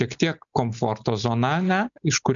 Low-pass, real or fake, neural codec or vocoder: 7.2 kHz; real; none